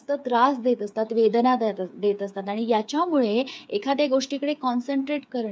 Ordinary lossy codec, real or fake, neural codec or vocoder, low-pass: none; fake; codec, 16 kHz, 8 kbps, FreqCodec, smaller model; none